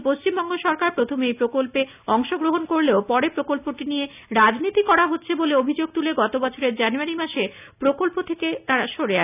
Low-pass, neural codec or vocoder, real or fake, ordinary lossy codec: 3.6 kHz; none; real; none